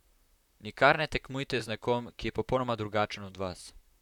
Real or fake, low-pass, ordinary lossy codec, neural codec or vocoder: fake; 19.8 kHz; none; vocoder, 44.1 kHz, 128 mel bands, Pupu-Vocoder